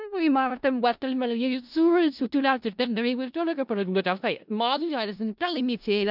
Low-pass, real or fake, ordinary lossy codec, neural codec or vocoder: 5.4 kHz; fake; none; codec, 16 kHz in and 24 kHz out, 0.4 kbps, LongCat-Audio-Codec, four codebook decoder